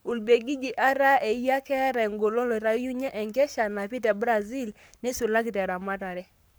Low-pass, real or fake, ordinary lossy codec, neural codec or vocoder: none; fake; none; codec, 44.1 kHz, 7.8 kbps, Pupu-Codec